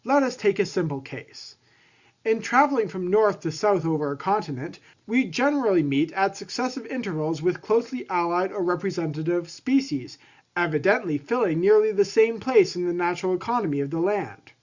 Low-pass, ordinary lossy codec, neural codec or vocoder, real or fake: 7.2 kHz; Opus, 64 kbps; none; real